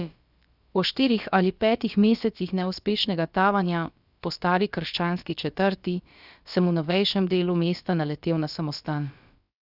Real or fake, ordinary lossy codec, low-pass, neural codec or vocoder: fake; Opus, 64 kbps; 5.4 kHz; codec, 16 kHz, about 1 kbps, DyCAST, with the encoder's durations